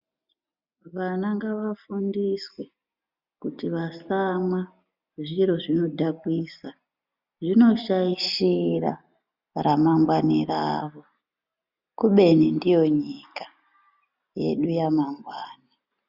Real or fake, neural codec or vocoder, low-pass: real; none; 5.4 kHz